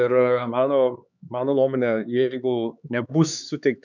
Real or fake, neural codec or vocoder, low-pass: fake; codec, 16 kHz, 4 kbps, X-Codec, HuBERT features, trained on LibriSpeech; 7.2 kHz